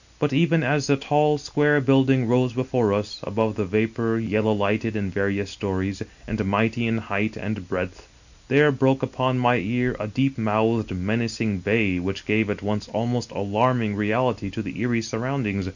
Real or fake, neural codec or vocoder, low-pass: real; none; 7.2 kHz